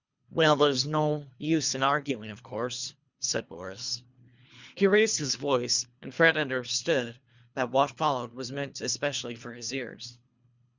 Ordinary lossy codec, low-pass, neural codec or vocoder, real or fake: Opus, 64 kbps; 7.2 kHz; codec, 24 kHz, 3 kbps, HILCodec; fake